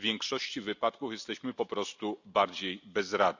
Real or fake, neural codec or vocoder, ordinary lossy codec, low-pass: real; none; none; 7.2 kHz